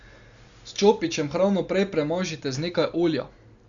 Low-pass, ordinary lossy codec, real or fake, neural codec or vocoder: 7.2 kHz; Opus, 64 kbps; real; none